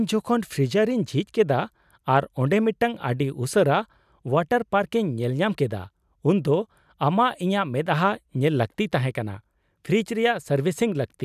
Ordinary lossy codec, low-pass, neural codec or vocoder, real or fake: none; 14.4 kHz; none; real